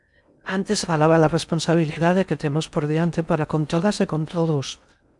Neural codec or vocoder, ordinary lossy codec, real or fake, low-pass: codec, 16 kHz in and 24 kHz out, 0.6 kbps, FocalCodec, streaming, 4096 codes; MP3, 96 kbps; fake; 10.8 kHz